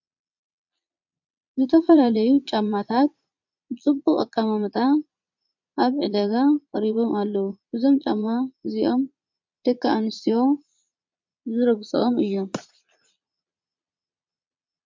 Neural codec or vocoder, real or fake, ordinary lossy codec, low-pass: vocoder, 22.05 kHz, 80 mel bands, Vocos; fake; MP3, 64 kbps; 7.2 kHz